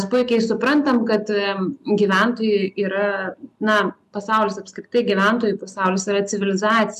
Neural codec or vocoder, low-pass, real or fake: none; 14.4 kHz; real